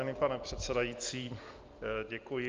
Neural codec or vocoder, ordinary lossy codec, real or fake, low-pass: none; Opus, 32 kbps; real; 7.2 kHz